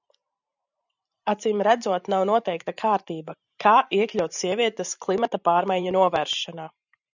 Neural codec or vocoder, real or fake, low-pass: vocoder, 44.1 kHz, 128 mel bands every 512 samples, BigVGAN v2; fake; 7.2 kHz